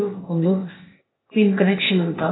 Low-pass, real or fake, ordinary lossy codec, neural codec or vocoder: 7.2 kHz; fake; AAC, 16 kbps; codec, 16 kHz, 0.8 kbps, ZipCodec